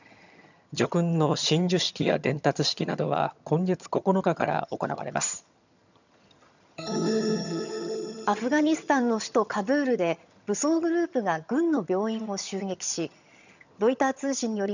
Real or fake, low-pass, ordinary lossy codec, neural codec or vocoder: fake; 7.2 kHz; none; vocoder, 22.05 kHz, 80 mel bands, HiFi-GAN